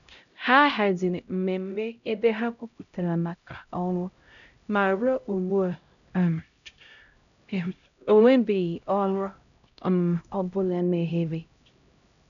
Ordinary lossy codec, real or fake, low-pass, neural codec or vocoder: none; fake; 7.2 kHz; codec, 16 kHz, 0.5 kbps, X-Codec, HuBERT features, trained on LibriSpeech